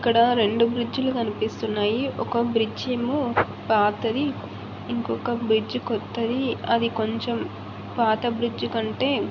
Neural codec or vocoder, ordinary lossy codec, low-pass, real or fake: none; MP3, 48 kbps; 7.2 kHz; real